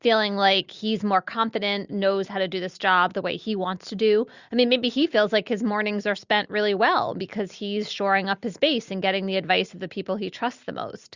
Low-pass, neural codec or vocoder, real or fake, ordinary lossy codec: 7.2 kHz; none; real; Opus, 64 kbps